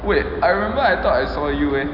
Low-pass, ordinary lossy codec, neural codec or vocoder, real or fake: 5.4 kHz; none; none; real